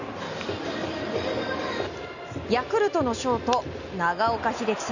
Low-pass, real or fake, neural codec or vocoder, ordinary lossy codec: 7.2 kHz; real; none; none